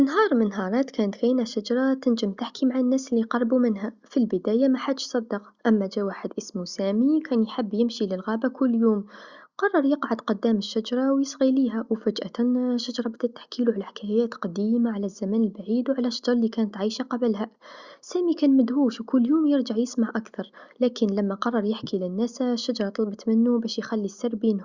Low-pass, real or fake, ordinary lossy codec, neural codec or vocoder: 7.2 kHz; real; Opus, 64 kbps; none